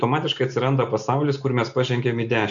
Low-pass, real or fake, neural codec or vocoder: 7.2 kHz; real; none